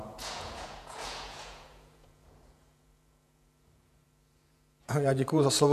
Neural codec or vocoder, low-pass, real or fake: vocoder, 48 kHz, 128 mel bands, Vocos; 14.4 kHz; fake